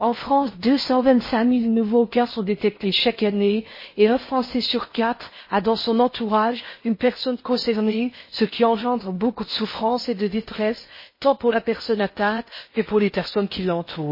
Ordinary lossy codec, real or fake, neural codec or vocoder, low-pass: MP3, 24 kbps; fake; codec, 16 kHz in and 24 kHz out, 0.6 kbps, FocalCodec, streaming, 4096 codes; 5.4 kHz